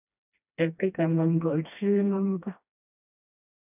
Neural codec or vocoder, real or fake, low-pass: codec, 16 kHz, 1 kbps, FreqCodec, smaller model; fake; 3.6 kHz